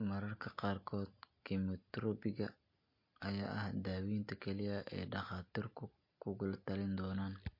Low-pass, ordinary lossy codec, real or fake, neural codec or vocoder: 5.4 kHz; MP3, 32 kbps; real; none